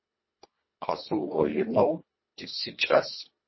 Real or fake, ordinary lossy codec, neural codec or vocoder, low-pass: fake; MP3, 24 kbps; codec, 24 kHz, 1.5 kbps, HILCodec; 7.2 kHz